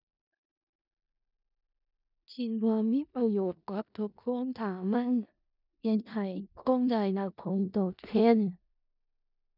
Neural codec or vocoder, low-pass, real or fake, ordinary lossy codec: codec, 16 kHz in and 24 kHz out, 0.4 kbps, LongCat-Audio-Codec, four codebook decoder; 5.4 kHz; fake; none